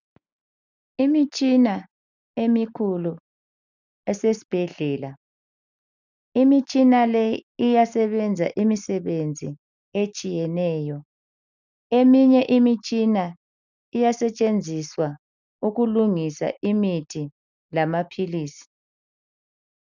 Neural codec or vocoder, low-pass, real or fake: none; 7.2 kHz; real